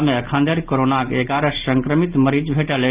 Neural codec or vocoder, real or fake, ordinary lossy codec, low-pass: none; real; Opus, 32 kbps; 3.6 kHz